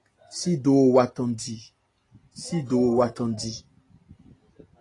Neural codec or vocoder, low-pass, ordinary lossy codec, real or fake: none; 10.8 kHz; AAC, 32 kbps; real